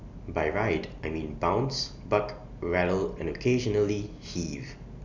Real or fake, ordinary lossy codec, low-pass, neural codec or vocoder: real; none; 7.2 kHz; none